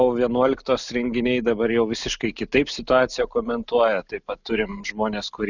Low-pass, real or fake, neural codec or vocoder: 7.2 kHz; real; none